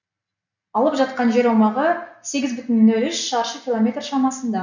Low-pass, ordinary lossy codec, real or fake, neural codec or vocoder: 7.2 kHz; none; real; none